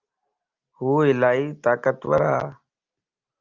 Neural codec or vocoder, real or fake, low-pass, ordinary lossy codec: none; real; 7.2 kHz; Opus, 24 kbps